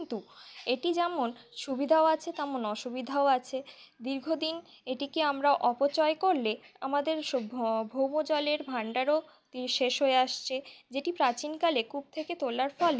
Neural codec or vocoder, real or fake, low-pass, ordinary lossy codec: none; real; none; none